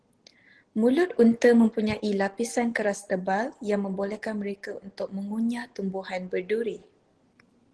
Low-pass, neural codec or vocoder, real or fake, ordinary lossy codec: 10.8 kHz; none; real; Opus, 16 kbps